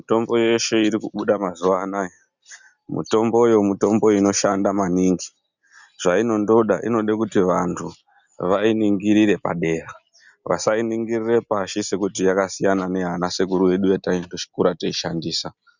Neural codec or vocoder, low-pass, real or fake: none; 7.2 kHz; real